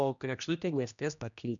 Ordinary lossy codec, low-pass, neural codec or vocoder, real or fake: MP3, 96 kbps; 7.2 kHz; codec, 16 kHz, 1 kbps, X-Codec, HuBERT features, trained on general audio; fake